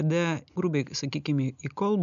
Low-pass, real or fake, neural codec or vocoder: 7.2 kHz; real; none